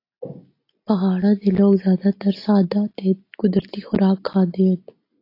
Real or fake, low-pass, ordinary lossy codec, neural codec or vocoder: real; 5.4 kHz; AAC, 32 kbps; none